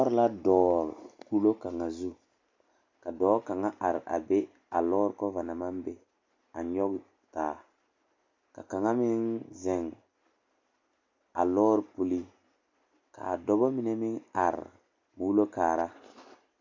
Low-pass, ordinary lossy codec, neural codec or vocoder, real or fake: 7.2 kHz; MP3, 64 kbps; none; real